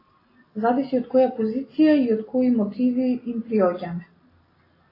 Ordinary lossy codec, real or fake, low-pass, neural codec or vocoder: AAC, 24 kbps; real; 5.4 kHz; none